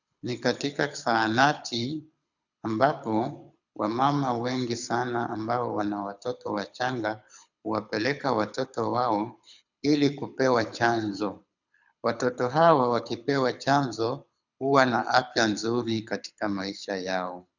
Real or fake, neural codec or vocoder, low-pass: fake; codec, 24 kHz, 6 kbps, HILCodec; 7.2 kHz